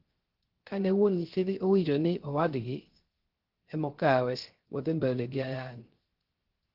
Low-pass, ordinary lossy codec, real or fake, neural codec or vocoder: 5.4 kHz; Opus, 16 kbps; fake; codec, 16 kHz, 0.3 kbps, FocalCodec